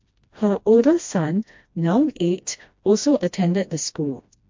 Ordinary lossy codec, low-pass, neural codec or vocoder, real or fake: MP3, 48 kbps; 7.2 kHz; codec, 16 kHz, 1 kbps, FreqCodec, smaller model; fake